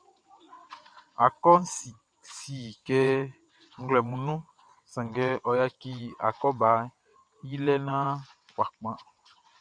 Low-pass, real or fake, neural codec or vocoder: 9.9 kHz; fake; vocoder, 22.05 kHz, 80 mel bands, WaveNeXt